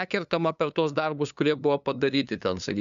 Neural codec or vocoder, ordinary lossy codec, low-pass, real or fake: codec, 16 kHz, 2 kbps, FunCodec, trained on LibriTTS, 25 frames a second; MP3, 96 kbps; 7.2 kHz; fake